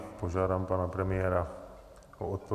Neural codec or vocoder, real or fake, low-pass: none; real; 14.4 kHz